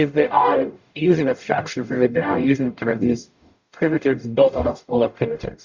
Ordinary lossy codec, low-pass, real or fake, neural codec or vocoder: Opus, 64 kbps; 7.2 kHz; fake; codec, 44.1 kHz, 0.9 kbps, DAC